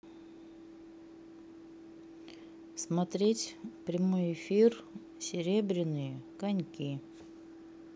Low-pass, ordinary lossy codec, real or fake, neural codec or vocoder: none; none; real; none